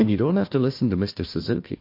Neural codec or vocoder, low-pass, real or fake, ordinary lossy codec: codec, 16 kHz, 1 kbps, FunCodec, trained on Chinese and English, 50 frames a second; 5.4 kHz; fake; MP3, 32 kbps